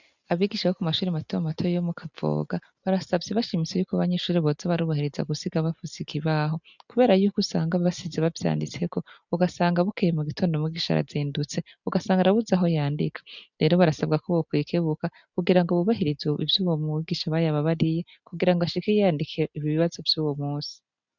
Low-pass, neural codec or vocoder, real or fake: 7.2 kHz; none; real